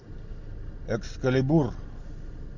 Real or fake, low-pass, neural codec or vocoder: real; 7.2 kHz; none